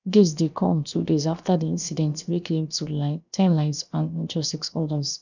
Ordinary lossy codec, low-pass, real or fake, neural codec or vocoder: none; 7.2 kHz; fake; codec, 16 kHz, 0.7 kbps, FocalCodec